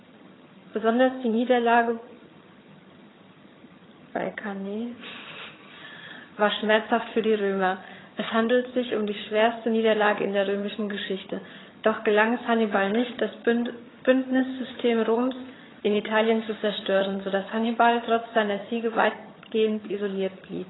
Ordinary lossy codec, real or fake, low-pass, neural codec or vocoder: AAC, 16 kbps; fake; 7.2 kHz; vocoder, 22.05 kHz, 80 mel bands, HiFi-GAN